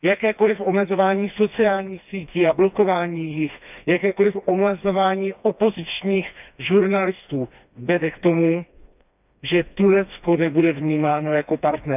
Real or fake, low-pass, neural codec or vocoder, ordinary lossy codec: fake; 3.6 kHz; codec, 16 kHz, 2 kbps, FreqCodec, smaller model; none